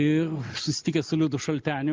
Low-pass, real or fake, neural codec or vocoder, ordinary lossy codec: 7.2 kHz; real; none; Opus, 24 kbps